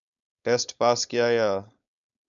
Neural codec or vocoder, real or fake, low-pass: codec, 16 kHz, 4.8 kbps, FACodec; fake; 7.2 kHz